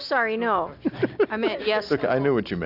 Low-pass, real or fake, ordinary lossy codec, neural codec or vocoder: 5.4 kHz; real; AAC, 48 kbps; none